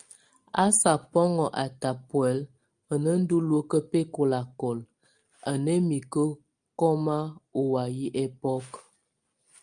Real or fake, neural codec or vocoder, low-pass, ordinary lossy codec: real; none; 9.9 kHz; Opus, 32 kbps